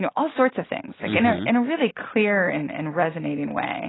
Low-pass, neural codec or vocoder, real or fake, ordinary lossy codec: 7.2 kHz; none; real; AAC, 16 kbps